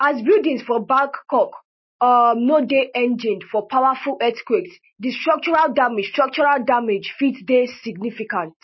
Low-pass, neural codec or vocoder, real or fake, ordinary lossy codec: 7.2 kHz; none; real; MP3, 24 kbps